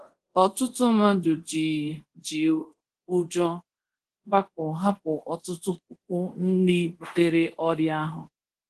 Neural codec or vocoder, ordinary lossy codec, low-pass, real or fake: codec, 24 kHz, 0.5 kbps, DualCodec; Opus, 16 kbps; 10.8 kHz; fake